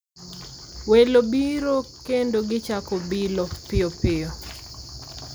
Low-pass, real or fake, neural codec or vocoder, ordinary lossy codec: none; real; none; none